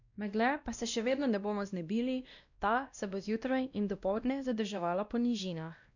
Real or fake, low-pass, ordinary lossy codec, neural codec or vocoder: fake; 7.2 kHz; none; codec, 16 kHz, 1 kbps, X-Codec, WavLM features, trained on Multilingual LibriSpeech